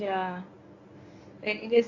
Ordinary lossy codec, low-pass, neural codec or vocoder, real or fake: AAC, 48 kbps; 7.2 kHz; codec, 24 kHz, 0.9 kbps, WavTokenizer, medium speech release version 1; fake